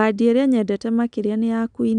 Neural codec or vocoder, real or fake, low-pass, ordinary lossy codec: none; real; 9.9 kHz; none